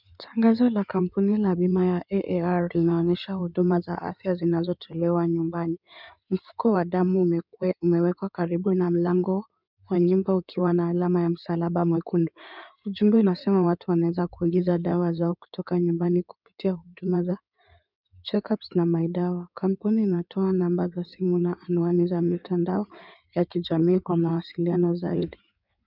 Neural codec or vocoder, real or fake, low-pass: codec, 16 kHz in and 24 kHz out, 2.2 kbps, FireRedTTS-2 codec; fake; 5.4 kHz